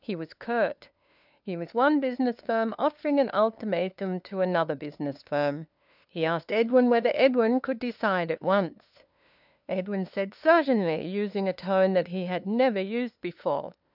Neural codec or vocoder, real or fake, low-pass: codec, 16 kHz, 2 kbps, FunCodec, trained on LibriTTS, 25 frames a second; fake; 5.4 kHz